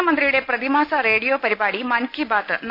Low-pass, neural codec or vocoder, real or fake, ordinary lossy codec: 5.4 kHz; none; real; none